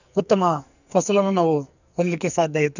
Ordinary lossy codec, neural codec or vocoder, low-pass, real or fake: none; codec, 44.1 kHz, 2.6 kbps, SNAC; 7.2 kHz; fake